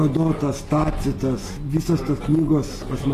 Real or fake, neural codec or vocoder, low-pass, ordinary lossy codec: real; none; 14.4 kHz; AAC, 48 kbps